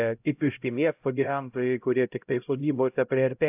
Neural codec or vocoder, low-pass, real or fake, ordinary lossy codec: codec, 16 kHz, 0.5 kbps, X-Codec, HuBERT features, trained on LibriSpeech; 3.6 kHz; fake; AAC, 32 kbps